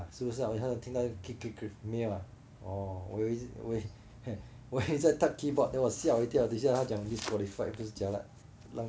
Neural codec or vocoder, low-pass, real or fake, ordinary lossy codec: none; none; real; none